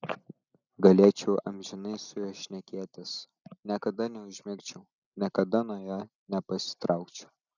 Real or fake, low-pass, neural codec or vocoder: real; 7.2 kHz; none